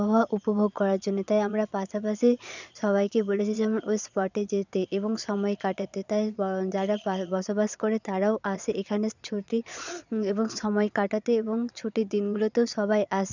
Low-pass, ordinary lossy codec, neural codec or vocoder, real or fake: 7.2 kHz; none; vocoder, 22.05 kHz, 80 mel bands, Vocos; fake